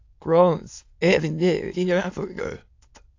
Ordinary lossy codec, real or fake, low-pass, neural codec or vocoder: AAC, 48 kbps; fake; 7.2 kHz; autoencoder, 22.05 kHz, a latent of 192 numbers a frame, VITS, trained on many speakers